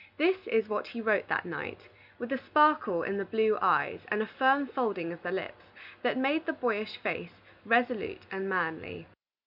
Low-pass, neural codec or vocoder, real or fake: 5.4 kHz; none; real